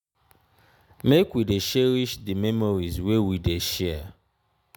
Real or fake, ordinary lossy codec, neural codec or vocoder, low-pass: real; none; none; none